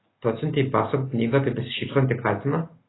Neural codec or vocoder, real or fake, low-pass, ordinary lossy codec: none; real; 7.2 kHz; AAC, 16 kbps